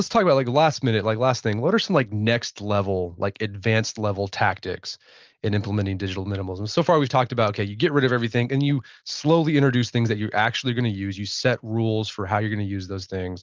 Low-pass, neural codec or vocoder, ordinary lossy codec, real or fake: 7.2 kHz; none; Opus, 32 kbps; real